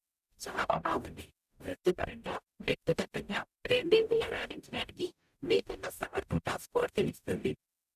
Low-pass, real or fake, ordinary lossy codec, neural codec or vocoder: 14.4 kHz; fake; none; codec, 44.1 kHz, 0.9 kbps, DAC